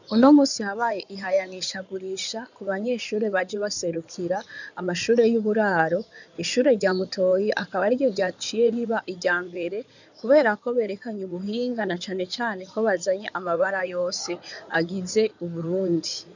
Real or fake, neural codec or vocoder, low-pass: fake; codec, 16 kHz in and 24 kHz out, 2.2 kbps, FireRedTTS-2 codec; 7.2 kHz